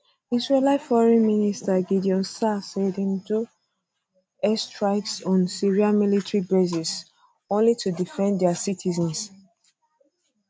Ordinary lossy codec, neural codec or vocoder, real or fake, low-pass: none; none; real; none